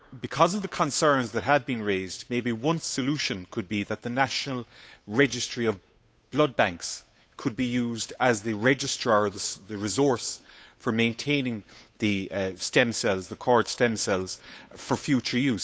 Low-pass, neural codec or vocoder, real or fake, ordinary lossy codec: none; codec, 16 kHz, 8 kbps, FunCodec, trained on Chinese and English, 25 frames a second; fake; none